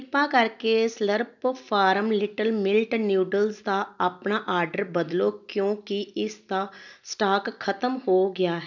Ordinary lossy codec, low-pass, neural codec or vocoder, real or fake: none; 7.2 kHz; none; real